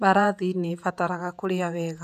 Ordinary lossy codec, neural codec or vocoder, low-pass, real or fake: none; vocoder, 48 kHz, 128 mel bands, Vocos; 14.4 kHz; fake